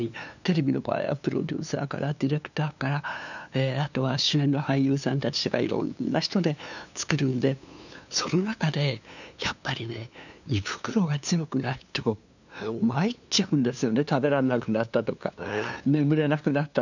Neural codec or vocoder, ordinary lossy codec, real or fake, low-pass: codec, 16 kHz, 2 kbps, FunCodec, trained on LibriTTS, 25 frames a second; none; fake; 7.2 kHz